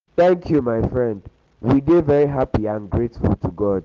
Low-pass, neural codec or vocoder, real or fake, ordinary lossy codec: 7.2 kHz; none; real; Opus, 16 kbps